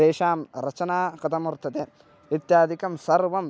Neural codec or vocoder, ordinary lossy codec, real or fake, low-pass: none; none; real; none